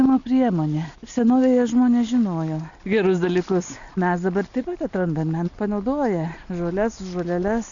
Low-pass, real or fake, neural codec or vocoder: 7.2 kHz; real; none